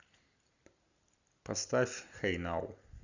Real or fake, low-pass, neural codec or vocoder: real; 7.2 kHz; none